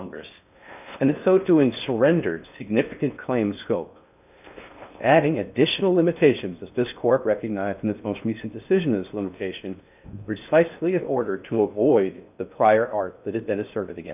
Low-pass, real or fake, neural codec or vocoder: 3.6 kHz; fake; codec, 16 kHz in and 24 kHz out, 0.6 kbps, FocalCodec, streaming, 4096 codes